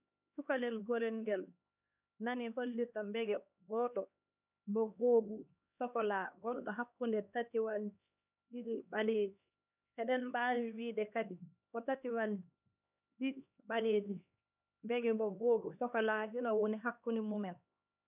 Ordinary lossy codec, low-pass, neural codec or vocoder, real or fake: none; 3.6 kHz; codec, 16 kHz, 2 kbps, X-Codec, HuBERT features, trained on LibriSpeech; fake